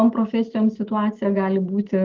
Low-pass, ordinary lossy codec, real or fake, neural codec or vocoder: 7.2 kHz; Opus, 16 kbps; real; none